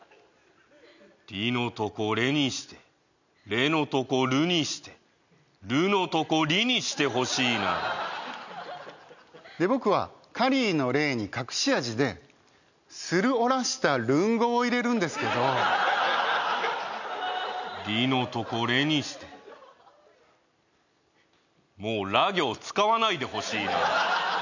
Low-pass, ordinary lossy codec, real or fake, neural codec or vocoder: 7.2 kHz; none; real; none